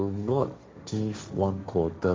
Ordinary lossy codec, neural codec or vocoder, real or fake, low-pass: none; codec, 16 kHz, 1.1 kbps, Voila-Tokenizer; fake; none